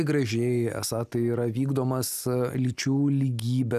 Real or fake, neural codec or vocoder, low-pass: real; none; 14.4 kHz